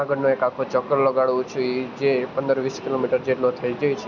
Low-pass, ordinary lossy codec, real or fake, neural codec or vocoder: 7.2 kHz; none; real; none